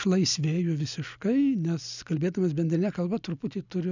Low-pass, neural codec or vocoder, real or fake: 7.2 kHz; none; real